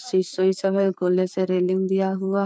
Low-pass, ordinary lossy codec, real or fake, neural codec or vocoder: none; none; fake; codec, 16 kHz, 4 kbps, FreqCodec, larger model